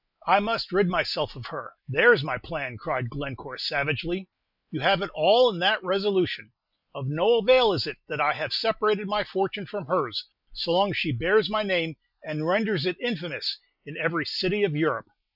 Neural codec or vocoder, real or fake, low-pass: none; real; 5.4 kHz